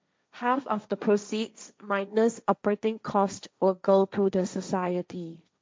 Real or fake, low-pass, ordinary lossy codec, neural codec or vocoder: fake; none; none; codec, 16 kHz, 1.1 kbps, Voila-Tokenizer